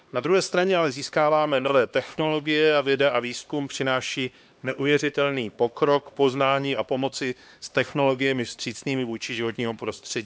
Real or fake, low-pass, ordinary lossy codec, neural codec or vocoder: fake; none; none; codec, 16 kHz, 2 kbps, X-Codec, HuBERT features, trained on LibriSpeech